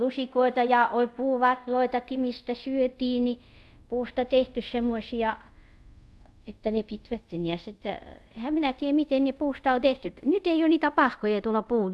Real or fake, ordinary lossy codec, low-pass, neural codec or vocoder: fake; none; none; codec, 24 kHz, 0.5 kbps, DualCodec